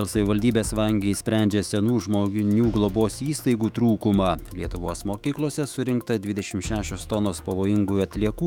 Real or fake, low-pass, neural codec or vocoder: fake; 19.8 kHz; autoencoder, 48 kHz, 128 numbers a frame, DAC-VAE, trained on Japanese speech